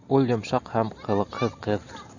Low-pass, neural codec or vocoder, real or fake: 7.2 kHz; none; real